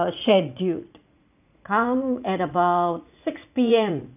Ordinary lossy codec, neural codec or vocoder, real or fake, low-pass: none; vocoder, 44.1 kHz, 80 mel bands, Vocos; fake; 3.6 kHz